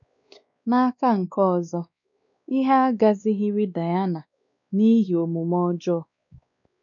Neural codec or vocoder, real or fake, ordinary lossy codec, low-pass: codec, 16 kHz, 2 kbps, X-Codec, WavLM features, trained on Multilingual LibriSpeech; fake; none; 7.2 kHz